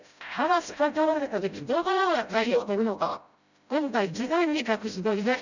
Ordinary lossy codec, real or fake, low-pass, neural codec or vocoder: none; fake; 7.2 kHz; codec, 16 kHz, 0.5 kbps, FreqCodec, smaller model